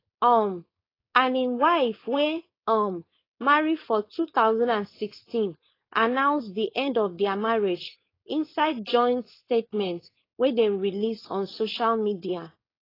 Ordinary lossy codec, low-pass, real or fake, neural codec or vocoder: AAC, 24 kbps; 5.4 kHz; fake; codec, 16 kHz, 4.8 kbps, FACodec